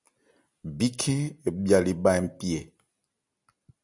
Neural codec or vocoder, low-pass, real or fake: none; 10.8 kHz; real